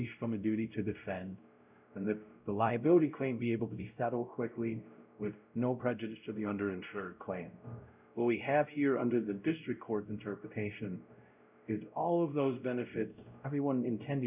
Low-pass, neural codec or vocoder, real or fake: 3.6 kHz; codec, 16 kHz, 0.5 kbps, X-Codec, WavLM features, trained on Multilingual LibriSpeech; fake